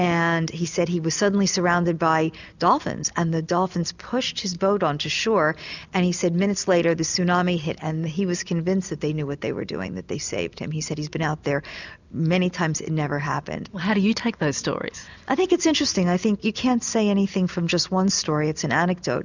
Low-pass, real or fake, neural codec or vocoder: 7.2 kHz; real; none